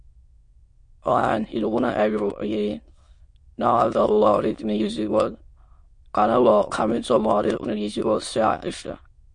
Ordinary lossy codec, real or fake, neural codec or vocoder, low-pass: MP3, 48 kbps; fake; autoencoder, 22.05 kHz, a latent of 192 numbers a frame, VITS, trained on many speakers; 9.9 kHz